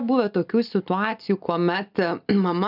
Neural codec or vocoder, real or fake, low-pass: none; real; 5.4 kHz